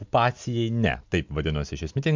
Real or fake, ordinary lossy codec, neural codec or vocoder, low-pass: real; MP3, 64 kbps; none; 7.2 kHz